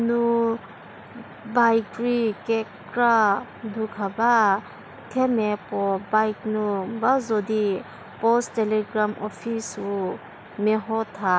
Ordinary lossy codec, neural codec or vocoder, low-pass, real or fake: none; none; none; real